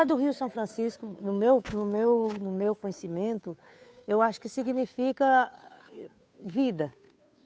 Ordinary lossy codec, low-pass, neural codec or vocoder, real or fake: none; none; codec, 16 kHz, 2 kbps, FunCodec, trained on Chinese and English, 25 frames a second; fake